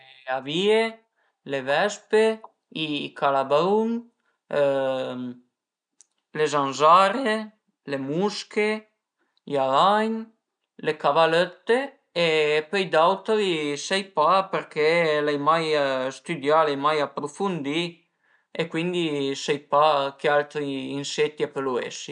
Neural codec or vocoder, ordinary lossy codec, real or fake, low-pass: none; none; real; 9.9 kHz